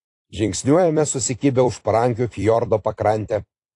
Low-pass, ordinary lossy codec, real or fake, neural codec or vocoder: 10.8 kHz; AAC, 48 kbps; fake; vocoder, 44.1 kHz, 128 mel bands every 256 samples, BigVGAN v2